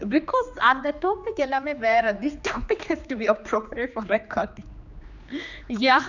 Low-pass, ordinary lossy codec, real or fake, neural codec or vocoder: 7.2 kHz; none; fake; codec, 16 kHz, 2 kbps, X-Codec, HuBERT features, trained on general audio